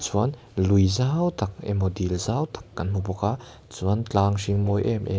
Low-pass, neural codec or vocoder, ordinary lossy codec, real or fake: none; none; none; real